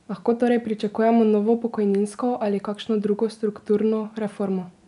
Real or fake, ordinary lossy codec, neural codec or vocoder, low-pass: real; none; none; 10.8 kHz